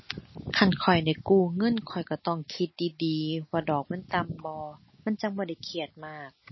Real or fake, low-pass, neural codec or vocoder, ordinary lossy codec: real; 7.2 kHz; none; MP3, 24 kbps